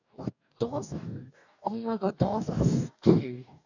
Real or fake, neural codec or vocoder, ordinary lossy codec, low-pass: fake; codec, 44.1 kHz, 2.6 kbps, DAC; none; 7.2 kHz